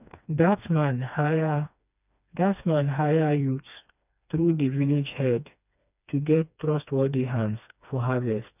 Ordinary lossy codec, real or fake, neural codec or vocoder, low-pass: none; fake; codec, 16 kHz, 2 kbps, FreqCodec, smaller model; 3.6 kHz